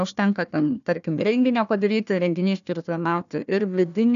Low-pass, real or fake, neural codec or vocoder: 7.2 kHz; fake; codec, 16 kHz, 1 kbps, FunCodec, trained on Chinese and English, 50 frames a second